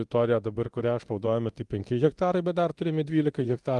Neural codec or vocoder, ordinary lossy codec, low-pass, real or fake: codec, 24 kHz, 0.9 kbps, DualCodec; Opus, 24 kbps; 10.8 kHz; fake